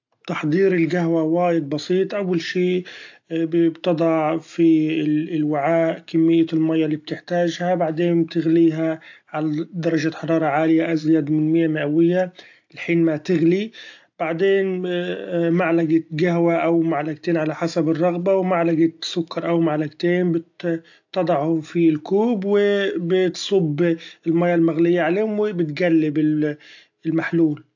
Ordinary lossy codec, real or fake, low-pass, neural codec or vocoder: AAC, 48 kbps; real; 7.2 kHz; none